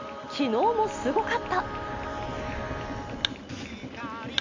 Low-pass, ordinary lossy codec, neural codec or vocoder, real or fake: 7.2 kHz; MP3, 48 kbps; none; real